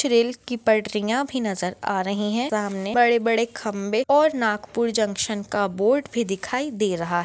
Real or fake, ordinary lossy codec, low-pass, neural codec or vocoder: real; none; none; none